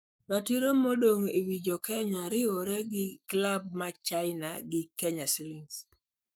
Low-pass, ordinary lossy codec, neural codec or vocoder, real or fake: none; none; codec, 44.1 kHz, 7.8 kbps, Pupu-Codec; fake